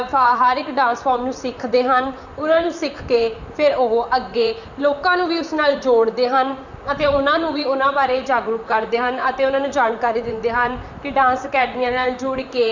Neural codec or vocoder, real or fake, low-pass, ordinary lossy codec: vocoder, 44.1 kHz, 128 mel bands, Pupu-Vocoder; fake; 7.2 kHz; none